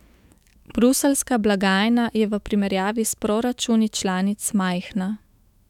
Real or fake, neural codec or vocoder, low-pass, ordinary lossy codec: fake; autoencoder, 48 kHz, 128 numbers a frame, DAC-VAE, trained on Japanese speech; 19.8 kHz; none